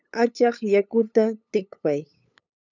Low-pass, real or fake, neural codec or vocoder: 7.2 kHz; fake; codec, 16 kHz, 8 kbps, FunCodec, trained on LibriTTS, 25 frames a second